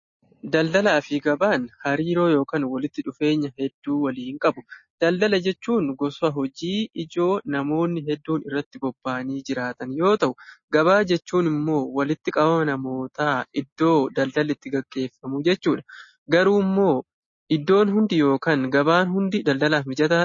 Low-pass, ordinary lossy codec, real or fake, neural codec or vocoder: 7.2 kHz; MP3, 32 kbps; real; none